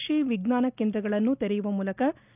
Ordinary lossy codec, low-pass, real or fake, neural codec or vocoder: none; 3.6 kHz; real; none